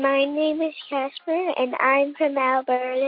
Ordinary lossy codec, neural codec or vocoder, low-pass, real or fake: MP3, 32 kbps; none; 5.4 kHz; real